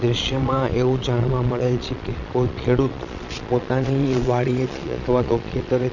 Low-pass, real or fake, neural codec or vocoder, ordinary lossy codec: 7.2 kHz; fake; vocoder, 22.05 kHz, 80 mel bands, Vocos; none